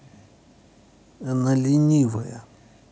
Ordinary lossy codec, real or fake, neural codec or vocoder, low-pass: none; real; none; none